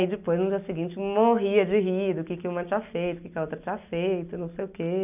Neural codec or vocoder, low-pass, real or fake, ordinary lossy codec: none; 3.6 kHz; real; none